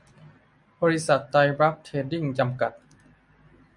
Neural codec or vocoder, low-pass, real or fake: none; 10.8 kHz; real